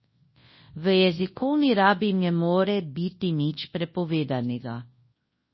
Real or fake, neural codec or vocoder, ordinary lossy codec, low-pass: fake; codec, 24 kHz, 0.9 kbps, WavTokenizer, large speech release; MP3, 24 kbps; 7.2 kHz